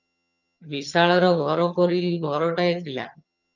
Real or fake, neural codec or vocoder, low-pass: fake; vocoder, 22.05 kHz, 80 mel bands, HiFi-GAN; 7.2 kHz